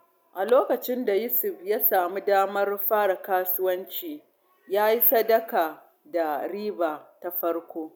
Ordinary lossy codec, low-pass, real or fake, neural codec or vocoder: none; none; real; none